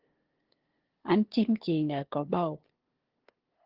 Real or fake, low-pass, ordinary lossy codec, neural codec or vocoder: fake; 5.4 kHz; Opus, 16 kbps; codec, 16 kHz, 2 kbps, FunCodec, trained on LibriTTS, 25 frames a second